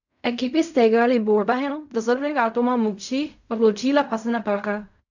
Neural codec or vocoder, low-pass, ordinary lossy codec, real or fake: codec, 16 kHz in and 24 kHz out, 0.4 kbps, LongCat-Audio-Codec, fine tuned four codebook decoder; 7.2 kHz; none; fake